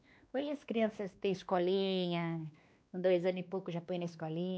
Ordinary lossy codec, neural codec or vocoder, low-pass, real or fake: none; codec, 16 kHz, 2 kbps, X-Codec, WavLM features, trained on Multilingual LibriSpeech; none; fake